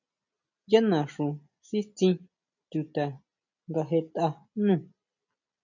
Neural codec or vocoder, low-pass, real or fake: none; 7.2 kHz; real